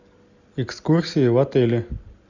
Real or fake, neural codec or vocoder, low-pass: real; none; 7.2 kHz